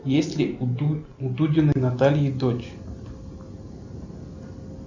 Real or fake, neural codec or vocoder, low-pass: real; none; 7.2 kHz